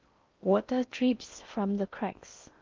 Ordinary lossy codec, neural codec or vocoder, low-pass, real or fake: Opus, 24 kbps; codec, 16 kHz in and 24 kHz out, 0.6 kbps, FocalCodec, streaming, 4096 codes; 7.2 kHz; fake